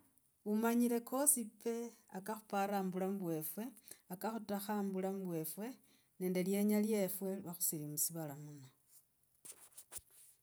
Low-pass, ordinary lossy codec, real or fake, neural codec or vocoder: none; none; real; none